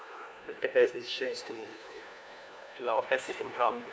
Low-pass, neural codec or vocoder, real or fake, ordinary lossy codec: none; codec, 16 kHz, 1 kbps, FunCodec, trained on LibriTTS, 50 frames a second; fake; none